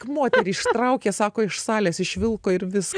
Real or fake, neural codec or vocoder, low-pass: real; none; 9.9 kHz